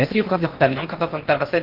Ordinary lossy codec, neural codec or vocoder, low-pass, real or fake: Opus, 32 kbps; codec, 16 kHz in and 24 kHz out, 0.6 kbps, FocalCodec, streaming, 2048 codes; 5.4 kHz; fake